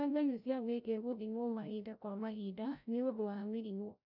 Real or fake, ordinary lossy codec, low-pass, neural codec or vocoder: fake; none; 5.4 kHz; codec, 16 kHz, 0.5 kbps, FreqCodec, larger model